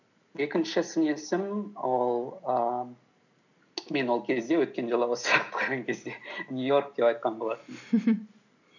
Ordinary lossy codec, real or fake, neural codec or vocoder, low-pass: none; real; none; 7.2 kHz